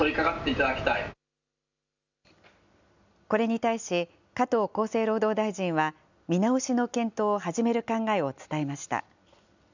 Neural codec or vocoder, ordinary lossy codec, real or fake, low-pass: none; none; real; 7.2 kHz